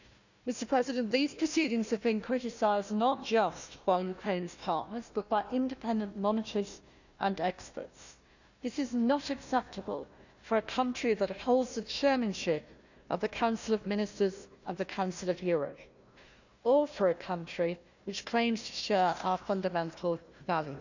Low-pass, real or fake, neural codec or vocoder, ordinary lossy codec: 7.2 kHz; fake; codec, 16 kHz, 1 kbps, FunCodec, trained on Chinese and English, 50 frames a second; none